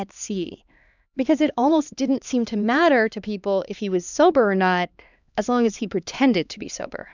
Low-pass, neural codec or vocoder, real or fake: 7.2 kHz; codec, 16 kHz, 2 kbps, X-Codec, HuBERT features, trained on LibriSpeech; fake